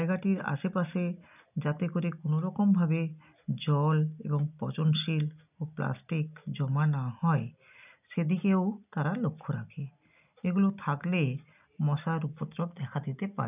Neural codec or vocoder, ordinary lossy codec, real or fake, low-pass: none; none; real; 3.6 kHz